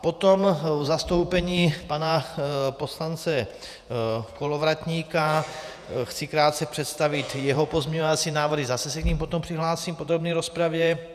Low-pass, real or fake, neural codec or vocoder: 14.4 kHz; fake; vocoder, 48 kHz, 128 mel bands, Vocos